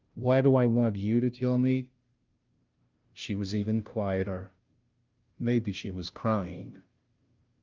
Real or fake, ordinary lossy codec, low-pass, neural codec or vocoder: fake; Opus, 32 kbps; 7.2 kHz; codec, 16 kHz, 0.5 kbps, FunCodec, trained on Chinese and English, 25 frames a second